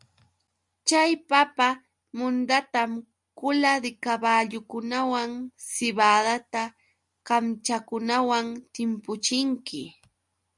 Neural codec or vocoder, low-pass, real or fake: none; 10.8 kHz; real